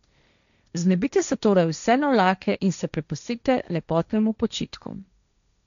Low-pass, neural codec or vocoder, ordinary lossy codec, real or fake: 7.2 kHz; codec, 16 kHz, 1.1 kbps, Voila-Tokenizer; none; fake